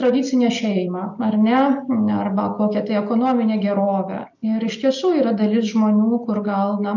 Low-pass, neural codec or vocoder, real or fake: 7.2 kHz; none; real